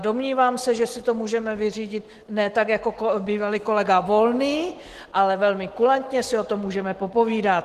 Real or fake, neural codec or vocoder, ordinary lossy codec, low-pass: fake; autoencoder, 48 kHz, 128 numbers a frame, DAC-VAE, trained on Japanese speech; Opus, 16 kbps; 14.4 kHz